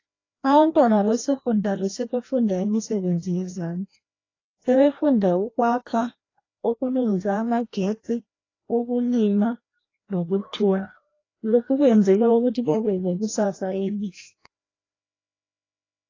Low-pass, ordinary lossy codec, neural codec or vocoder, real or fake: 7.2 kHz; AAC, 32 kbps; codec, 16 kHz, 1 kbps, FreqCodec, larger model; fake